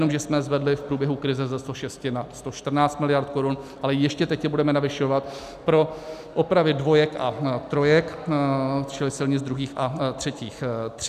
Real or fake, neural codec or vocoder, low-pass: real; none; 14.4 kHz